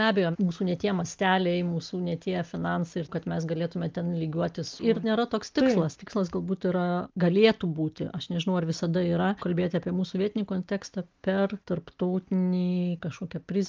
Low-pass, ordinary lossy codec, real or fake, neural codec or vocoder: 7.2 kHz; Opus, 24 kbps; real; none